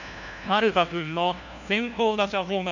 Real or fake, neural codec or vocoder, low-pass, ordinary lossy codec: fake; codec, 16 kHz, 1 kbps, FunCodec, trained on LibriTTS, 50 frames a second; 7.2 kHz; none